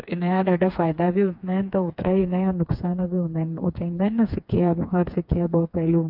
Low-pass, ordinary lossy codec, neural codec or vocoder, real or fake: 5.4 kHz; MP3, 48 kbps; codec, 16 kHz, 4 kbps, FreqCodec, smaller model; fake